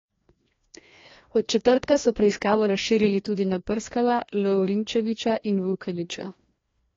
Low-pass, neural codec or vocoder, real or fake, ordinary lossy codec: 7.2 kHz; codec, 16 kHz, 1 kbps, FreqCodec, larger model; fake; AAC, 32 kbps